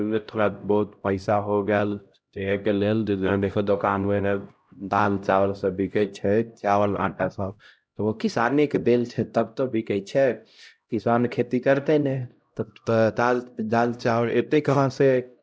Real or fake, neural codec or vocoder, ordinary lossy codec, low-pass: fake; codec, 16 kHz, 0.5 kbps, X-Codec, HuBERT features, trained on LibriSpeech; none; none